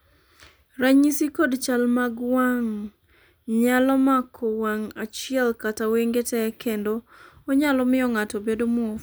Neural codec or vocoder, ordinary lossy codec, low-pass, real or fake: none; none; none; real